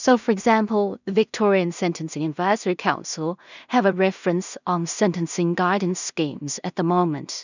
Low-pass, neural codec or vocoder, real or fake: 7.2 kHz; codec, 16 kHz in and 24 kHz out, 0.4 kbps, LongCat-Audio-Codec, two codebook decoder; fake